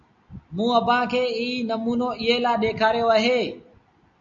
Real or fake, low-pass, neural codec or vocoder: real; 7.2 kHz; none